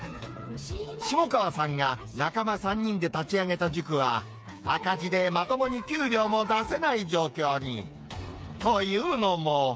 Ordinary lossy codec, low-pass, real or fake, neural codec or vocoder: none; none; fake; codec, 16 kHz, 4 kbps, FreqCodec, smaller model